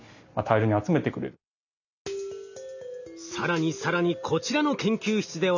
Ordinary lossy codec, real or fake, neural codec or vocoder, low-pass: none; real; none; 7.2 kHz